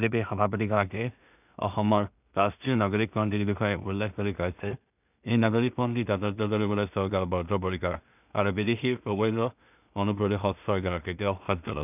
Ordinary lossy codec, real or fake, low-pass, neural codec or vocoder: none; fake; 3.6 kHz; codec, 16 kHz in and 24 kHz out, 0.4 kbps, LongCat-Audio-Codec, two codebook decoder